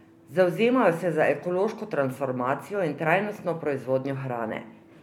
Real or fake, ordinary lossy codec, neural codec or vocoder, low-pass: fake; MP3, 96 kbps; vocoder, 44.1 kHz, 128 mel bands every 256 samples, BigVGAN v2; 19.8 kHz